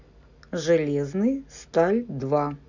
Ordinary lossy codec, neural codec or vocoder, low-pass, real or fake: none; none; 7.2 kHz; real